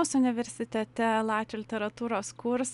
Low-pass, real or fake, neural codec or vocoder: 10.8 kHz; real; none